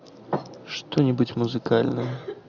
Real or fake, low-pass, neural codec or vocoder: real; 7.2 kHz; none